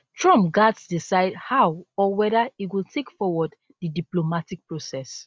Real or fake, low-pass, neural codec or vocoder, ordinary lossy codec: real; none; none; none